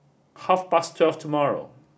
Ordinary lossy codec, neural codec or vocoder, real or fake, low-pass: none; none; real; none